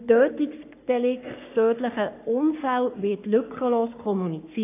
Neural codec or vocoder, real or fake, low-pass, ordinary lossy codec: codec, 44.1 kHz, 3.4 kbps, Pupu-Codec; fake; 3.6 kHz; AAC, 24 kbps